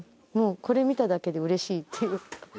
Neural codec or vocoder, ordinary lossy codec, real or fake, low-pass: none; none; real; none